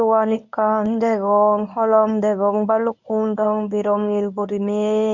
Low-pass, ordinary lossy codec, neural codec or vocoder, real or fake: 7.2 kHz; none; codec, 24 kHz, 0.9 kbps, WavTokenizer, medium speech release version 1; fake